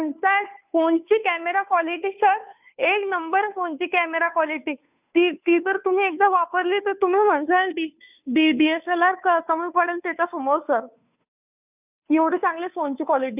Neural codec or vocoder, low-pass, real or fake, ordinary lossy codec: codec, 16 kHz, 2 kbps, FunCodec, trained on Chinese and English, 25 frames a second; 3.6 kHz; fake; none